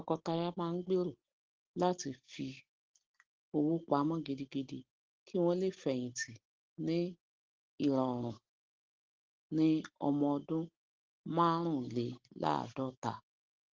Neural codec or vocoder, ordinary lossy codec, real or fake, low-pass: none; Opus, 16 kbps; real; 7.2 kHz